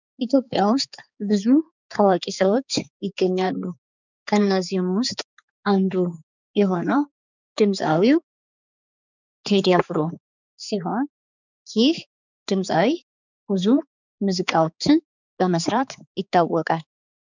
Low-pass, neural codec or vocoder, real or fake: 7.2 kHz; codec, 16 kHz, 4 kbps, X-Codec, HuBERT features, trained on balanced general audio; fake